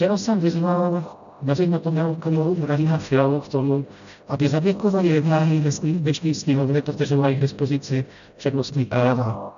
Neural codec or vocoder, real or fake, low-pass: codec, 16 kHz, 0.5 kbps, FreqCodec, smaller model; fake; 7.2 kHz